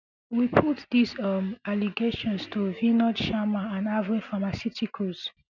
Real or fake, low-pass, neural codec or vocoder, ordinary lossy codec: real; 7.2 kHz; none; none